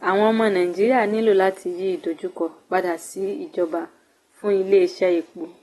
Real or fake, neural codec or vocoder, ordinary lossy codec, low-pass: real; none; AAC, 32 kbps; 19.8 kHz